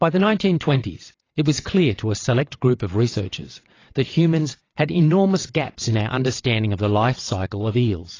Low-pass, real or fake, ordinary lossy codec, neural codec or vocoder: 7.2 kHz; fake; AAC, 32 kbps; vocoder, 22.05 kHz, 80 mel bands, WaveNeXt